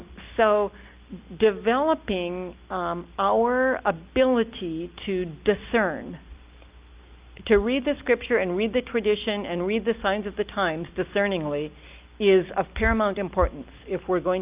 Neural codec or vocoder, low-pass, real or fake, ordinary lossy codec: none; 3.6 kHz; real; Opus, 24 kbps